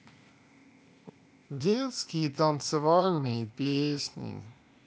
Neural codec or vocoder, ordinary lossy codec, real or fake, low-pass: codec, 16 kHz, 0.8 kbps, ZipCodec; none; fake; none